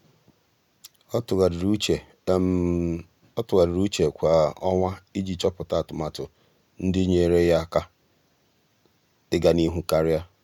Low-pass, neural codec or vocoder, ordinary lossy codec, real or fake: 19.8 kHz; none; none; real